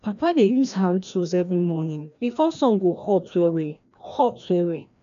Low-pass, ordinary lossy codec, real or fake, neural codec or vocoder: 7.2 kHz; MP3, 96 kbps; fake; codec, 16 kHz, 1 kbps, FreqCodec, larger model